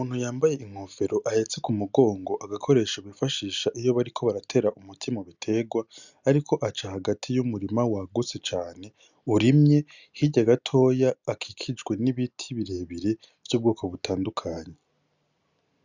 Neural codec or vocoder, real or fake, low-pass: none; real; 7.2 kHz